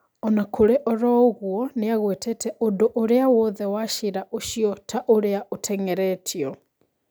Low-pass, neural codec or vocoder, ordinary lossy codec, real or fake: none; none; none; real